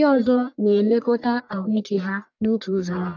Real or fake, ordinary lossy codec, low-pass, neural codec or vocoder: fake; none; 7.2 kHz; codec, 44.1 kHz, 1.7 kbps, Pupu-Codec